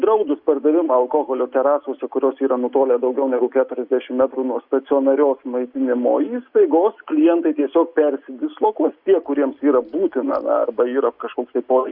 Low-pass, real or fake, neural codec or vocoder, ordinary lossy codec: 5.4 kHz; real; none; Opus, 64 kbps